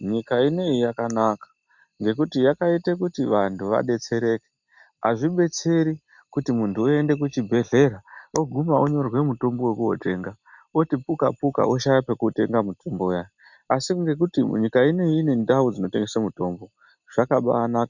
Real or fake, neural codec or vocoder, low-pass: real; none; 7.2 kHz